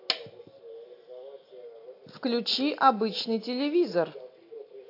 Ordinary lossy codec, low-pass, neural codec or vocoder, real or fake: AAC, 32 kbps; 5.4 kHz; none; real